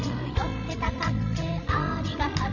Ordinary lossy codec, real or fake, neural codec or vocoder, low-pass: none; fake; codec, 16 kHz, 16 kbps, FreqCodec, larger model; 7.2 kHz